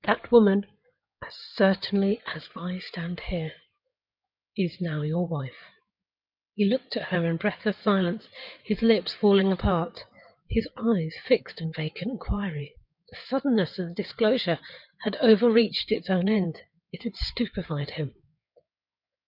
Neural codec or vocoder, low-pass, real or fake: codec, 16 kHz in and 24 kHz out, 2.2 kbps, FireRedTTS-2 codec; 5.4 kHz; fake